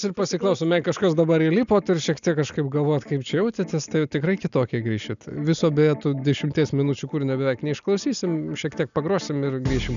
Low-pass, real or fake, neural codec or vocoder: 7.2 kHz; real; none